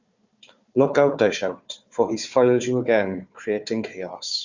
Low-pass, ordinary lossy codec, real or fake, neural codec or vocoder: 7.2 kHz; Opus, 64 kbps; fake; codec, 16 kHz, 4 kbps, FunCodec, trained on Chinese and English, 50 frames a second